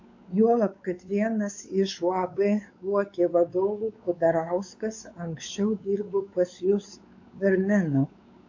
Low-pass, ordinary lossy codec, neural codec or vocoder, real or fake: 7.2 kHz; AAC, 48 kbps; codec, 16 kHz, 4 kbps, X-Codec, WavLM features, trained on Multilingual LibriSpeech; fake